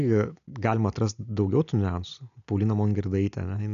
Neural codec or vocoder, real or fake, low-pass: none; real; 7.2 kHz